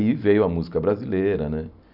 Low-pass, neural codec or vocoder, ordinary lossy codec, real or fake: 5.4 kHz; vocoder, 44.1 kHz, 128 mel bands every 256 samples, BigVGAN v2; none; fake